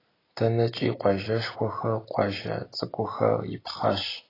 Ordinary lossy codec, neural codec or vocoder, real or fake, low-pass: AAC, 24 kbps; none; real; 5.4 kHz